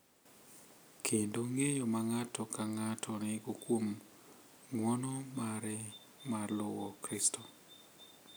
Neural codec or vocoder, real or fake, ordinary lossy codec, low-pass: none; real; none; none